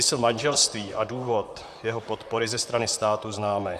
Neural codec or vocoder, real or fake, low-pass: vocoder, 44.1 kHz, 128 mel bands, Pupu-Vocoder; fake; 14.4 kHz